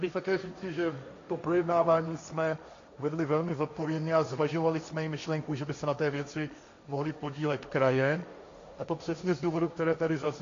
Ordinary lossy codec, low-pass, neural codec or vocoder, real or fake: AAC, 64 kbps; 7.2 kHz; codec, 16 kHz, 1.1 kbps, Voila-Tokenizer; fake